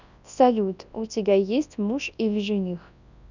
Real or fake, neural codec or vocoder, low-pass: fake; codec, 24 kHz, 0.9 kbps, WavTokenizer, large speech release; 7.2 kHz